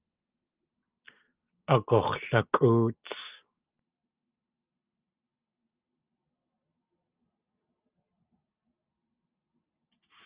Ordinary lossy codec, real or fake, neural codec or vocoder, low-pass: Opus, 24 kbps; real; none; 3.6 kHz